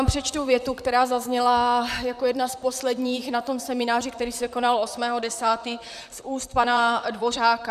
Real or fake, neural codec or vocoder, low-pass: fake; vocoder, 44.1 kHz, 128 mel bands, Pupu-Vocoder; 14.4 kHz